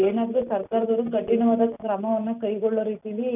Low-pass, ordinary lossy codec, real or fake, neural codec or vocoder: 3.6 kHz; none; real; none